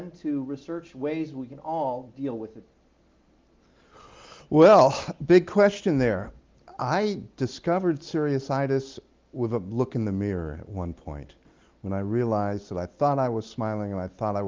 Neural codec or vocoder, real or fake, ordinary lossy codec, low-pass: none; real; Opus, 32 kbps; 7.2 kHz